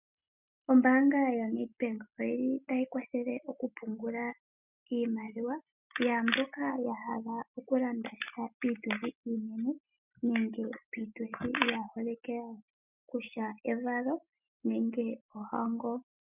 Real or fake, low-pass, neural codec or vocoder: real; 3.6 kHz; none